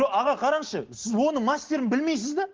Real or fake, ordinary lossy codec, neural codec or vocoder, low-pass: real; Opus, 16 kbps; none; 7.2 kHz